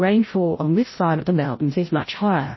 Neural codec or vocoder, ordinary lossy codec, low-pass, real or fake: codec, 16 kHz, 0.5 kbps, FreqCodec, larger model; MP3, 24 kbps; 7.2 kHz; fake